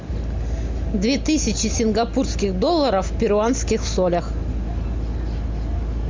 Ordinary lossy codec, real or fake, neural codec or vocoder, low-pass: MP3, 64 kbps; real; none; 7.2 kHz